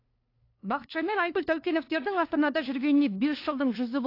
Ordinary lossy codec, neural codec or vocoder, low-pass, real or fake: AAC, 32 kbps; codec, 16 kHz, 2 kbps, FunCodec, trained on LibriTTS, 25 frames a second; 5.4 kHz; fake